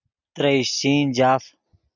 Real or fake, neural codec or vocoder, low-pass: real; none; 7.2 kHz